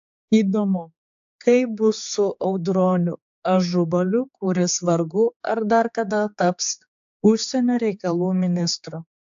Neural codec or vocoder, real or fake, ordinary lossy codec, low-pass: codec, 16 kHz, 4 kbps, X-Codec, HuBERT features, trained on general audio; fake; AAC, 64 kbps; 7.2 kHz